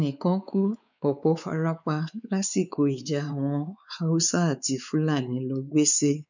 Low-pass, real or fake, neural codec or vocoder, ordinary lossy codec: 7.2 kHz; fake; codec, 16 kHz, 4 kbps, X-Codec, WavLM features, trained on Multilingual LibriSpeech; none